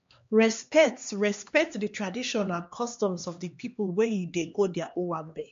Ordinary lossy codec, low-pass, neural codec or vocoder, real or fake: MP3, 48 kbps; 7.2 kHz; codec, 16 kHz, 2 kbps, X-Codec, HuBERT features, trained on LibriSpeech; fake